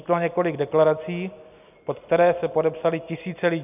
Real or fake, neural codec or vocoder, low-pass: real; none; 3.6 kHz